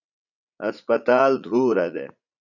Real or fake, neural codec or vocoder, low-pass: fake; vocoder, 44.1 kHz, 80 mel bands, Vocos; 7.2 kHz